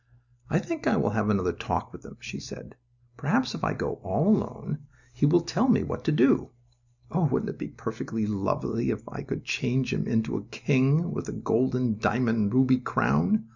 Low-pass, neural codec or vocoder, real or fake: 7.2 kHz; none; real